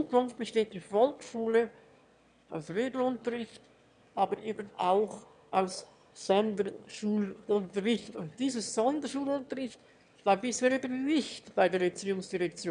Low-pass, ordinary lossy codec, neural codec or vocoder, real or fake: 9.9 kHz; none; autoencoder, 22.05 kHz, a latent of 192 numbers a frame, VITS, trained on one speaker; fake